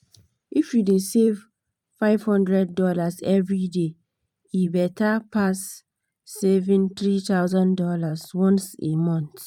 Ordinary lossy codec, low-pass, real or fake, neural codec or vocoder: none; 19.8 kHz; fake; vocoder, 44.1 kHz, 128 mel bands every 512 samples, BigVGAN v2